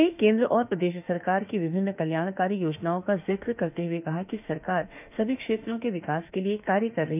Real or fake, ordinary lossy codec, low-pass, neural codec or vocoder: fake; none; 3.6 kHz; autoencoder, 48 kHz, 32 numbers a frame, DAC-VAE, trained on Japanese speech